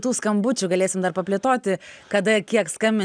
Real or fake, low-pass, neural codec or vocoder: real; 9.9 kHz; none